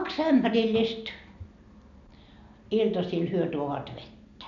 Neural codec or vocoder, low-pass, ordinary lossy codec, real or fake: none; 7.2 kHz; none; real